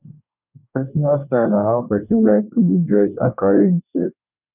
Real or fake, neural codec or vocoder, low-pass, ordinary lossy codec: fake; codec, 24 kHz, 1 kbps, SNAC; 3.6 kHz; none